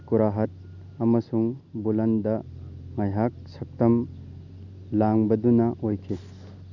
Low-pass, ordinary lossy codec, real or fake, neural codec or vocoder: 7.2 kHz; none; real; none